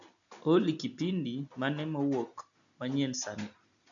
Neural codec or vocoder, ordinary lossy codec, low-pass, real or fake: none; none; 7.2 kHz; real